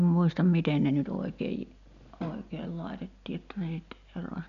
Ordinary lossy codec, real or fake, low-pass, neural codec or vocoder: none; real; 7.2 kHz; none